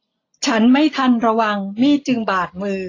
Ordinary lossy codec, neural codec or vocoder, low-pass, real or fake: AAC, 32 kbps; none; 7.2 kHz; real